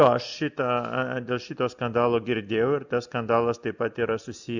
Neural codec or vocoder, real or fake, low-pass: none; real; 7.2 kHz